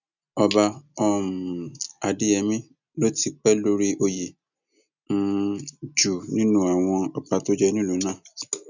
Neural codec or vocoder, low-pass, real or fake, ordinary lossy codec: none; 7.2 kHz; real; none